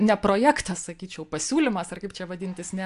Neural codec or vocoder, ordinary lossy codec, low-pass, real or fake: none; AAC, 64 kbps; 10.8 kHz; real